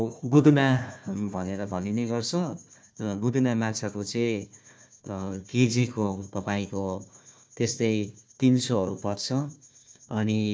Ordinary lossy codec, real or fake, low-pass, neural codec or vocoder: none; fake; none; codec, 16 kHz, 1 kbps, FunCodec, trained on Chinese and English, 50 frames a second